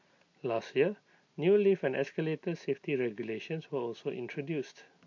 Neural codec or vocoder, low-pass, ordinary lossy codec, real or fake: none; 7.2 kHz; MP3, 48 kbps; real